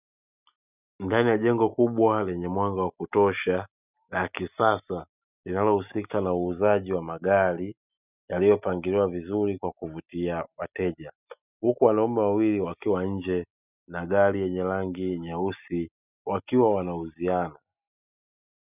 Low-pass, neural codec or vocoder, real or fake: 3.6 kHz; none; real